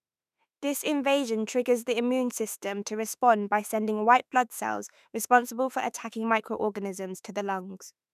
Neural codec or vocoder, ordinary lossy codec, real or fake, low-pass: autoencoder, 48 kHz, 32 numbers a frame, DAC-VAE, trained on Japanese speech; none; fake; 14.4 kHz